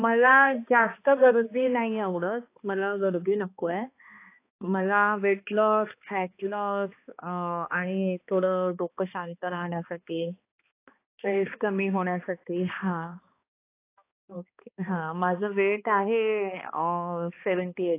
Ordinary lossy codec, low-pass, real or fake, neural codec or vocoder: AAC, 24 kbps; 3.6 kHz; fake; codec, 16 kHz, 2 kbps, X-Codec, HuBERT features, trained on balanced general audio